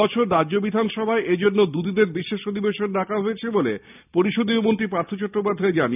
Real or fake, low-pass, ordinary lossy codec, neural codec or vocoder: real; 3.6 kHz; none; none